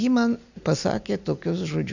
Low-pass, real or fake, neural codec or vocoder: 7.2 kHz; real; none